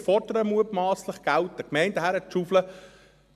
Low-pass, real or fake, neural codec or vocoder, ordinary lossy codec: 14.4 kHz; real; none; MP3, 96 kbps